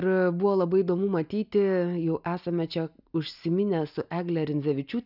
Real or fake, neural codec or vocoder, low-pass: real; none; 5.4 kHz